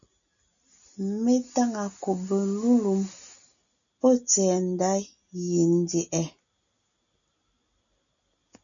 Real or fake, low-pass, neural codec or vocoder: real; 7.2 kHz; none